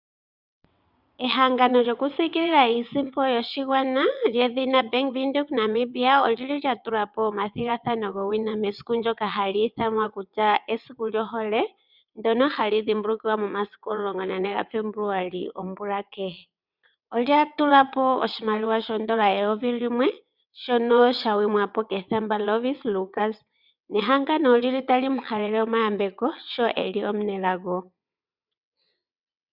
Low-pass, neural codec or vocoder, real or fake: 5.4 kHz; vocoder, 22.05 kHz, 80 mel bands, WaveNeXt; fake